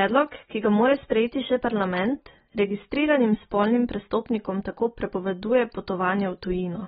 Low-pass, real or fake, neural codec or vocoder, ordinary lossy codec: 19.8 kHz; real; none; AAC, 16 kbps